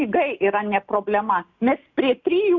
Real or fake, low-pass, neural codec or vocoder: real; 7.2 kHz; none